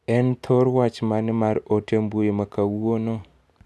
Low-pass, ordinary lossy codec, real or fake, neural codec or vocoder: none; none; real; none